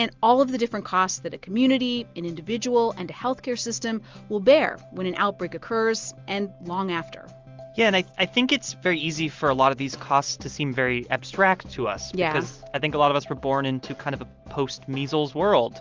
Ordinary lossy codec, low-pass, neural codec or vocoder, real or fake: Opus, 32 kbps; 7.2 kHz; none; real